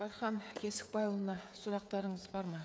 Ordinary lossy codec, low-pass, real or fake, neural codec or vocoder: none; none; fake; codec, 16 kHz, 16 kbps, FreqCodec, smaller model